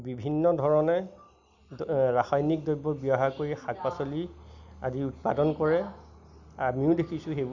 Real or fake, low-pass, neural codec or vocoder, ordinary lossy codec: real; 7.2 kHz; none; none